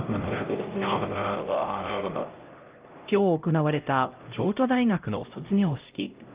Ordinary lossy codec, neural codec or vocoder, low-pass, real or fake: Opus, 32 kbps; codec, 16 kHz, 0.5 kbps, X-Codec, HuBERT features, trained on LibriSpeech; 3.6 kHz; fake